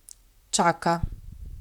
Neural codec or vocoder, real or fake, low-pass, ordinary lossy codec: vocoder, 44.1 kHz, 128 mel bands, Pupu-Vocoder; fake; 19.8 kHz; none